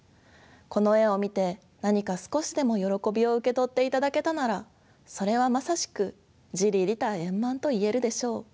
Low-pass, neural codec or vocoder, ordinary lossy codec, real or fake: none; none; none; real